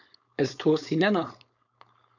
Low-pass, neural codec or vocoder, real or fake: 7.2 kHz; codec, 16 kHz, 4.8 kbps, FACodec; fake